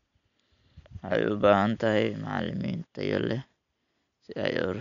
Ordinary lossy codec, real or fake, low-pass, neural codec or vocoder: none; real; 7.2 kHz; none